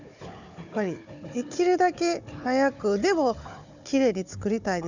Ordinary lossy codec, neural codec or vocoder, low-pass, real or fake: none; codec, 16 kHz, 4 kbps, FunCodec, trained on Chinese and English, 50 frames a second; 7.2 kHz; fake